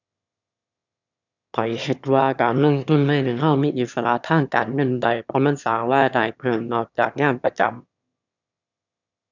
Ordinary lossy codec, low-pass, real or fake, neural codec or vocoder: none; 7.2 kHz; fake; autoencoder, 22.05 kHz, a latent of 192 numbers a frame, VITS, trained on one speaker